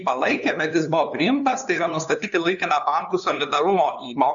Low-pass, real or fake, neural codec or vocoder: 7.2 kHz; fake; codec, 16 kHz, 2 kbps, FunCodec, trained on LibriTTS, 25 frames a second